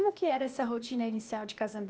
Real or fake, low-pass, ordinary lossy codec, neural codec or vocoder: fake; none; none; codec, 16 kHz, 0.8 kbps, ZipCodec